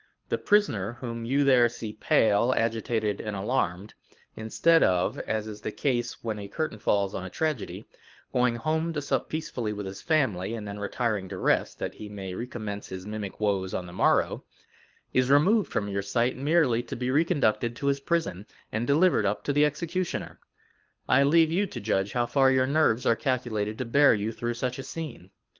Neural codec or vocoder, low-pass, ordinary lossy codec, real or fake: codec, 24 kHz, 6 kbps, HILCodec; 7.2 kHz; Opus, 32 kbps; fake